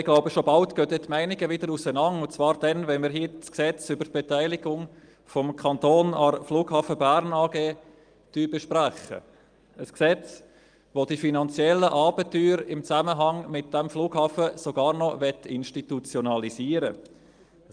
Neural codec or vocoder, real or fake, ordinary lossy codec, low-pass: none; real; Opus, 32 kbps; 9.9 kHz